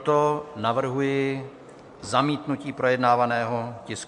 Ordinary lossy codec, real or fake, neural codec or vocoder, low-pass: MP3, 64 kbps; real; none; 10.8 kHz